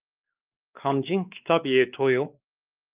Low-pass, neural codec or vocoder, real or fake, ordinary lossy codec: 3.6 kHz; codec, 16 kHz, 2 kbps, X-Codec, WavLM features, trained on Multilingual LibriSpeech; fake; Opus, 24 kbps